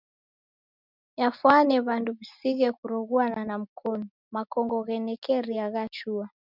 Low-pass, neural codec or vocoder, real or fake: 5.4 kHz; none; real